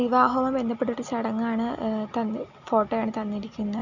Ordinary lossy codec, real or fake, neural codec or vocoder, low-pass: none; real; none; 7.2 kHz